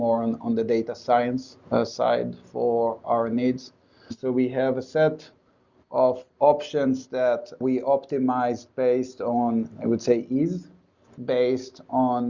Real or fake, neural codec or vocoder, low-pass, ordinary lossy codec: real; none; 7.2 kHz; Opus, 64 kbps